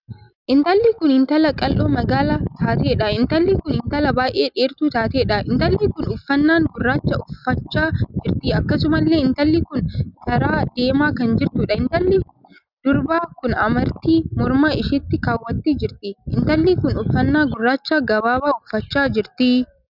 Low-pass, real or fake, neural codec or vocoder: 5.4 kHz; real; none